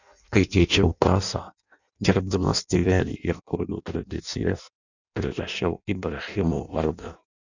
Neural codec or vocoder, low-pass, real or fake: codec, 16 kHz in and 24 kHz out, 0.6 kbps, FireRedTTS-2 codec; 7.2 kHz; fake